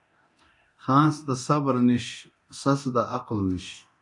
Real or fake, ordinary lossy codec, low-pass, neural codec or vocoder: fake; AAC, 64 kbps; 10.8 kHz; codec, 24 kHz, 0.9 kbps, DualCodec